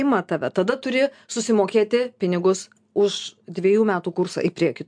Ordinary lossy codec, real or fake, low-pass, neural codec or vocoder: MP3, 64 kbps; real; 9.9 kHz; none